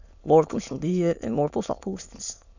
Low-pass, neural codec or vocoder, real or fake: 7.2 kHz; autoencoder, 22.05 kHz, a latent of 192 numbers a frame, VITS, trained on many speakers; fake